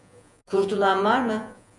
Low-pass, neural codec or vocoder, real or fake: 10.8 kHz; vocoder, 48 kHz, 128 mel bands, Vocos; fake